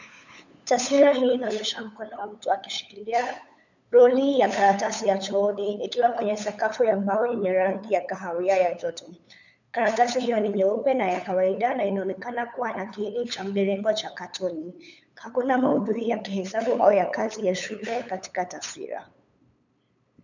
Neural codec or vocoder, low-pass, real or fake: codec, 16 kHz, 8 kbps, FunCodec, trained on LibriTTS, 25 frames a second; 7.2 kHz; fake